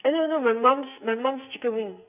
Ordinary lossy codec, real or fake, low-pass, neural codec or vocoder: none; fake; 3.6 kHz; codec, 44.1 kHz, 2.6 kbps, SNAC